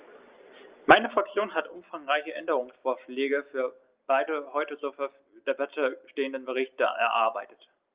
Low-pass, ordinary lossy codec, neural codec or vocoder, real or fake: 3.6 kHz; Opus, 32 kbps; none; real